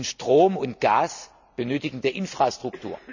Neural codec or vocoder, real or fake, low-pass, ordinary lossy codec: none; real; 7.2 kHz; none